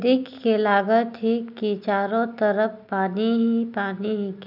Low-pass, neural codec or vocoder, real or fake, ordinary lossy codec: 5.4 kHz; none; real; none